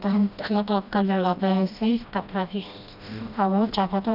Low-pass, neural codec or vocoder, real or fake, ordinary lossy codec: 5.4 kHz; codec, 16 kHz, 1 kbps, FreqCodec, smaller model; fake; none